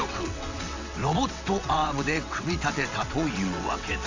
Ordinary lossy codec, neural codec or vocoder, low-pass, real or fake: AAC, 48 kbps; vocoder, 22.05 kHz, 80 mel bands, WaveNeXt; 7.2 kHz; fake